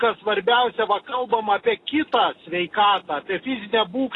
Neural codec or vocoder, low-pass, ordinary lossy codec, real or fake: none; 10.8 kHz; AAC, 32 kbps; real